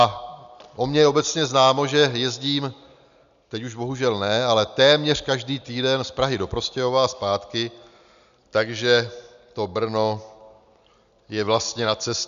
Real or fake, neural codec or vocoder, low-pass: real; none; 7.2 kHz